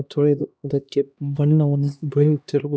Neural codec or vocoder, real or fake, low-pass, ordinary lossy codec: codec, 16 kHz, 1 kbps, X-Codec, HuBERT features, trained on LibriSpeech; fake; none; none